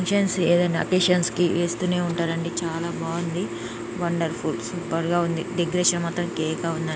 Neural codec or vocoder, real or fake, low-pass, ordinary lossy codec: none; real; none; none